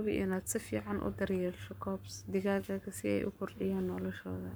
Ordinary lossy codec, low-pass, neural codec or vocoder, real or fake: none; none; codec, 44.1 kHz, 7.8 kbps, Pupu-Codec; fake